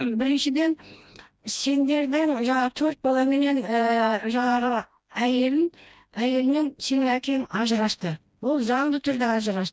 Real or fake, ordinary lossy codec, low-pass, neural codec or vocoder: fake; none; none; codec, 16 kHz, 1 kbps, FreqCodec, smaller model